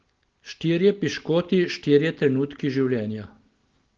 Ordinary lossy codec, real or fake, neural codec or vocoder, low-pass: Opus, 16 kbps; real; none; 7.2 kHz